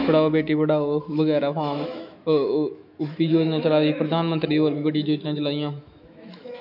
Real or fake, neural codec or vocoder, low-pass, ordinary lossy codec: fake; autoencoder, 48 kHz, 128 numbers a frame, DAC-VAE, trained on Japanese speech; 5.4 kHz; none